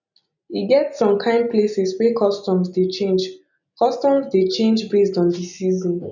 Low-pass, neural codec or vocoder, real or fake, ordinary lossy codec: 7.2 kHz; none; real; none